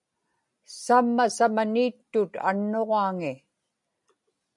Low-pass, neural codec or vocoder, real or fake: 10.8 kHz; none; real